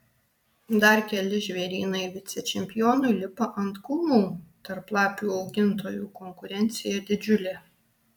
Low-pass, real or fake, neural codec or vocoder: 19.8 kHz; fake; vocoder, 44.1 kHz, 128 mel bands every 256 samples, BigVGAN v2